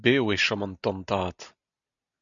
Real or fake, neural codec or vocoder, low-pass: real; none; 7.2 kHz